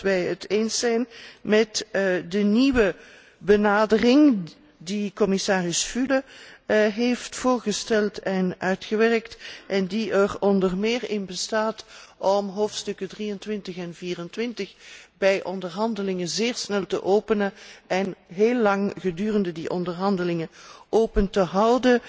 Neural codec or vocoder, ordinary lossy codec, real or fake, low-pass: none; none; real; none